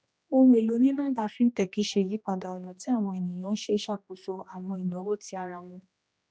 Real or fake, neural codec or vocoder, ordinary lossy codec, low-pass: fake; codec, 16 kHz, 1 kbps, X-Codec, HuBERT features, trained on general audio; none; none